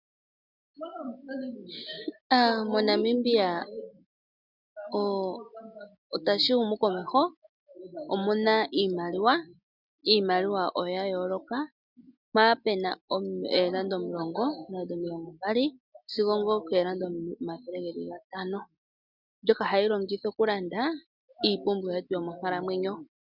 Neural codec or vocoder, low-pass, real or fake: none; 5.4 kHz; real